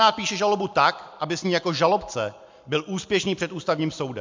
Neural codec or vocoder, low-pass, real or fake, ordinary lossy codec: none; 7.2 kHz; real; MP3, 64 kbps